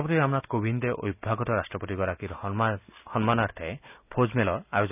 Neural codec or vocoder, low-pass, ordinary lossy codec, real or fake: none; 3.6 kHz; none; real